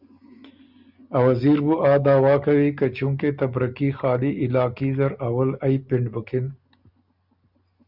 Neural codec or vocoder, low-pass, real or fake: none; 5.4 kHz; real